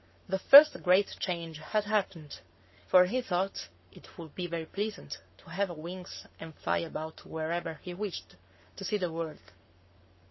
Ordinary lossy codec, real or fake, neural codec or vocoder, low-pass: MP3, 24 kbps; fake; codec, 44.1 kHz, 7.8 kbps, Pupu-Codec; 7.2 kHz